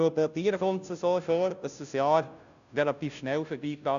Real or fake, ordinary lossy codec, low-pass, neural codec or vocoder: fake; none; 7.2 kHz; codec, 16 kHz, 0.5 kbps, FunCodec, trained on Chinese and English, 25 frames a second